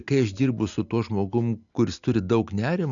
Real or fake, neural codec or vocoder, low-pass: real; none; 7.2 kHz